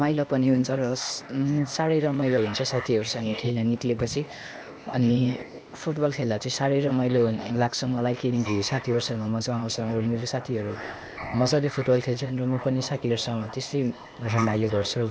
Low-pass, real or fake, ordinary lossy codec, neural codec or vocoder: none; fake; none; codec, 16 kHz, 0.8 kbps, ZipCodec